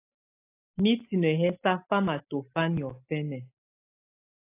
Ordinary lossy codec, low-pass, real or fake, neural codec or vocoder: AAC, 24 kbps; 3.6 kHz; real; none